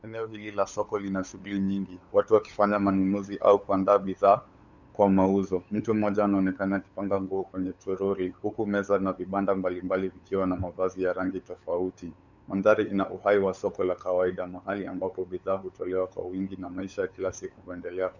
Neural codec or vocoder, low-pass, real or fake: codec, 16 kHz, 8 kbps, FunCodec, trained on LibriTTS, 25 frames a second; 7.2 kHz; fake